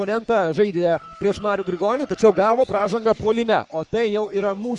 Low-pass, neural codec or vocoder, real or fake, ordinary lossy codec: 10.8 kHz; codec, 44.1 kHz, 3.4 kbps, Pupu-Codec; fake; Opus, 64 kbps